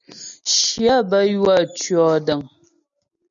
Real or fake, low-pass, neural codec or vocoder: real; 7.2 kHz; none